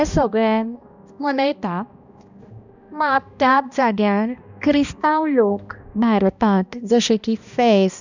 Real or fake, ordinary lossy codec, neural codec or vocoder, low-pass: fake; none; codec, 16 kHz, 1 kbps, X-Codec, HuBERT features, trained on balanced general audio; 7.2 kHz